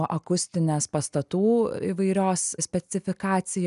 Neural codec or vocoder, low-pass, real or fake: none; 10.8 kHz; real